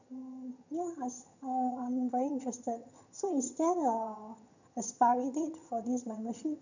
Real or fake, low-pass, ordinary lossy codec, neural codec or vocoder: fake; 7.2 kHz; none; vocoder, 22.05 kHz, 80 mel bands, HiFi-GAN